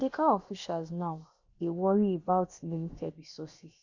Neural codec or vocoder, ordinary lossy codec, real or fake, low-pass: codec, 16 kHz, about 1 kbps, DyCAST, with the encoder's durations; Opus, 64 kbps; fake; 7.2 kHz